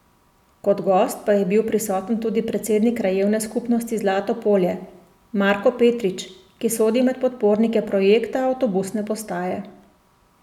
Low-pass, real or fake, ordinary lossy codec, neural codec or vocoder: 19.8 kHz; real; none; none